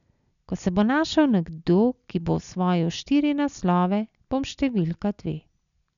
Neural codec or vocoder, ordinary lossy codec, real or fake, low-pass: none; none; real; 7.2 kHz